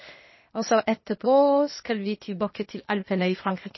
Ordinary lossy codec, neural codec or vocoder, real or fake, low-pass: MP3, 24 kbps; codec, 16 kHz, 0.8 kbps, ZipCodec; fake; 7.2 kHz